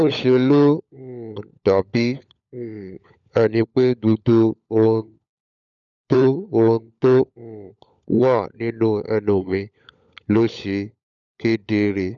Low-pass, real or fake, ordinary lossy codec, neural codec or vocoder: 7.2 kHz; fake; none; codec, 16 kHz, 16 kbps, FunCodec, trained on LibriTTS, 50 frames a second